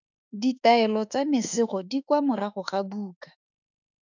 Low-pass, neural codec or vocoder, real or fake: 7.2 kHz; autoencoder, 48 kHz, 32 numbers a frame, DAC-VAE, trained on Japanese speech; fake